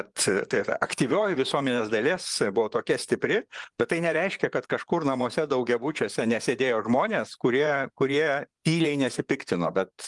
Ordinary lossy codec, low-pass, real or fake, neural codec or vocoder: Opus, 32 kbps; 10.8 kHz; fake; vocoder, 24 kHz, 100 mel bands, Vocos